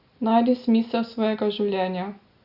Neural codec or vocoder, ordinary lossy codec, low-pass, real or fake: none; Opus, 64 kbps; 5.4 kHz; real